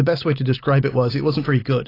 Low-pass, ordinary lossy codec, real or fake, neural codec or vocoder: 5.4 kHz; AAC, 24 kbps; real; none